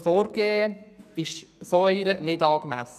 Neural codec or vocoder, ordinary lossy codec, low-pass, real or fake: codec, 44.1 kHz, 2.6 kbps, SNAC; none; 14.4 kHz; fake